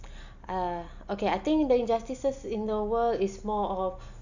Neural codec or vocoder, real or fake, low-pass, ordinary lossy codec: none; real; 7.2 kHz; none